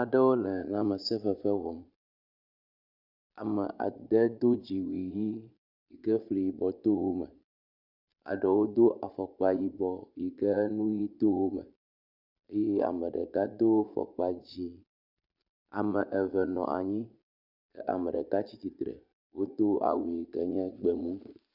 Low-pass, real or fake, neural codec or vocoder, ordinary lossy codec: 5.4 kHz; fake; vocoder, 22.05 kHz, 80 mel bands, Vocos; Opus, 64 kbps